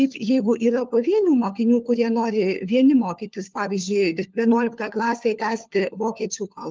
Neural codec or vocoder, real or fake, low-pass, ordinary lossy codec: codec, 16 kHz, 4 kbps, FunCodec, trained on LibriTTS, 50 frames a second; fake; 7.2 kHz; Opus, 32 kbps